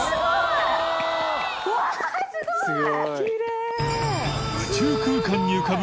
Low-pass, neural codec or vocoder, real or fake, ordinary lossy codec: none; none; real; none